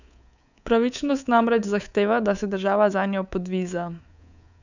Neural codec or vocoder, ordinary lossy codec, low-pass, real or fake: codec, 24 kHz, 3.1 kbps, DualCodec; none; 7.2 kHz; fake